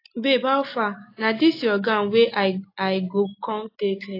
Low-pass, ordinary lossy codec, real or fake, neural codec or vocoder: 5.4 kHz; AAC, 32 kbps; real; none